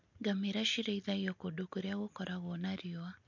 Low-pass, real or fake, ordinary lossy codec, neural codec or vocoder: 7.2 kHz; real; MP3, 48 kbps; none